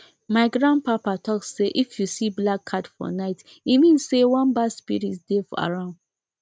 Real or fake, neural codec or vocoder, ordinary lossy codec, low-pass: real; none; none; none